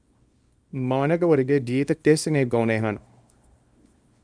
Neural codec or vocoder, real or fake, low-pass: codec, 24 kHz, 0.9 kbps, WavTokenizer, small release; fake; 9.9 kHz